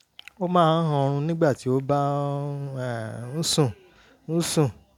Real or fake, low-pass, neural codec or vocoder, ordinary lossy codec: real; 19.8 kHz; none; none